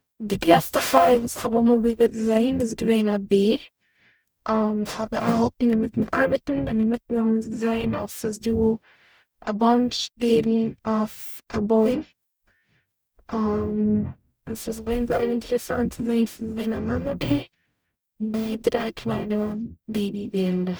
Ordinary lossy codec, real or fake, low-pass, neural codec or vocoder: none; fake; none; codec, 44.1 kHz, 0.9 kbps, DAC